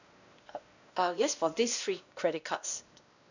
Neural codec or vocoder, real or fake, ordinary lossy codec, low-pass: codec, 16 kHz, 1 kbps, X-Codec, WavLM features, trained on Multilingual LibriSpeech; fake; none; 7.2 kHz